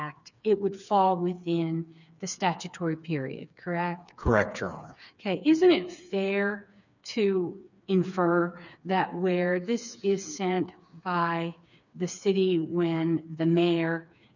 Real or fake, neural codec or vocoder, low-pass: fake; codec, 16 kHz, 4 kbps, FreqCodec, smaller model; 7.2 kHz